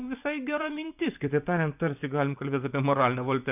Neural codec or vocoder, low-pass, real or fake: vocoder, 24 kHz, 100 mel bands, Vocos; 3.6 kHz; fake